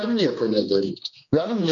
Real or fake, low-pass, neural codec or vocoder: fake; 7.2 kHz; codec, 16 kHz, 2 kbps, X-Codec, HuBERT features, trained on general audio